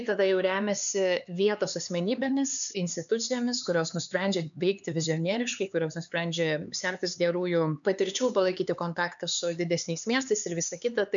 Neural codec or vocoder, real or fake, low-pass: codec, 16 kHz, 4 kbps, X-Codec, HuBERT features, trained on LibriSpeech; fake; 7.2 kHz